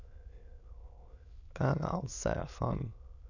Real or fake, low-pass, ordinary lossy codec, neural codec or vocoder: fake; 7.2 kHz; none; autoencoder, 22.05 kHz, a latent of 192 numbers a frame, VITS, trained on many speakers